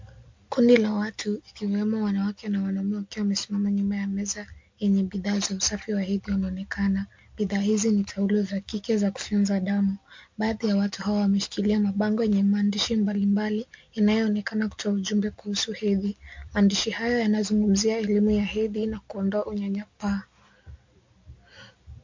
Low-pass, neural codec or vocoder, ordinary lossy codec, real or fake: 7.2 kHz; none; MP3, 48 kbps; real